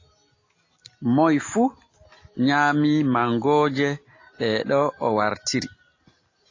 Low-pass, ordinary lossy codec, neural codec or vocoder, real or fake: 7.2 kHz; AAC, 32 kbps; none; real